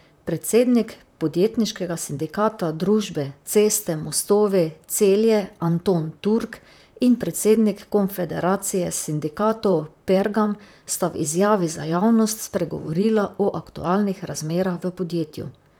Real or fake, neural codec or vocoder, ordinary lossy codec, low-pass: fake; vocoder, 44.1 kHz, 128 mel bands, Pupu-Vocoder; none; none